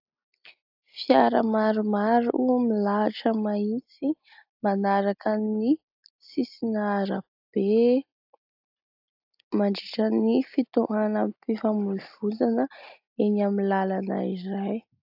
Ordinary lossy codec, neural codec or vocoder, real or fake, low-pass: AAC, 48 kbps; none; real; 5.4 kHz